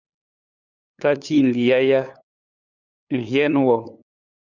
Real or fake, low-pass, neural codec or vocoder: fake; 7.2 kHz; codec, 16 kHz, 8 kbps, FunCodec, trained on LibriTTS, 25 frames a second